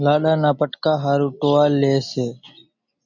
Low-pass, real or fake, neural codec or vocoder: 7.2 kHz; real; none